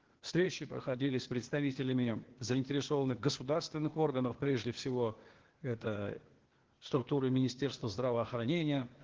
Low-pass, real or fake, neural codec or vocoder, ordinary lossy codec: 7.2 kHz; fake; codec, 16 kHz, 0.8 kbps, ZipCodec; Opus, 16 kbps